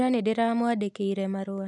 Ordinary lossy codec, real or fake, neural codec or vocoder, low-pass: none; real; none; 10.8 kHz